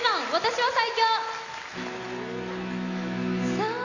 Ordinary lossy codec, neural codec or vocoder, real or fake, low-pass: none; none; real; 7.2 kHz